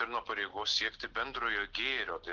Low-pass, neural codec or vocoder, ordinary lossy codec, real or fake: 7.2 kHz; none; Opus, 16 kbps; real